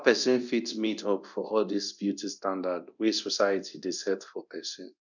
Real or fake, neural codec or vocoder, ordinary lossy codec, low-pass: fake; codec, 24 kHz, 0.9 kbps, DualCodec; none; 7.2 kHz